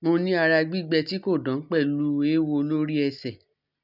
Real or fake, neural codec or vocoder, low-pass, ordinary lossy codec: real; none; 5.4 kHz; none